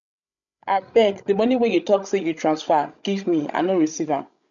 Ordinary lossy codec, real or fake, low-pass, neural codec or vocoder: none; fake; 7.2 kHz; codec, 16 kHz, 16 kbps, FreqCodec, larger model